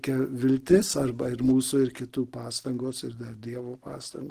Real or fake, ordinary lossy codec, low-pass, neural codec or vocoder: real; Opus, 16 kbps; 14.4 kHz; none